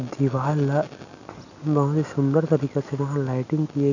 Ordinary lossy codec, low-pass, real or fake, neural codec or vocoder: none; 7.2 kHz; real; none